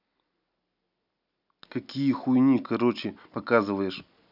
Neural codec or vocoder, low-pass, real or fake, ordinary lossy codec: none; 5.4 kHz; real; none